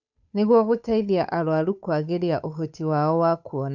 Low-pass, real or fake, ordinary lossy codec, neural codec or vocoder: 7.2 kHz; fake; none; codec, 16 kHz, 8 kbps, FunCodec, trained on Chinese and English, 25 frames a second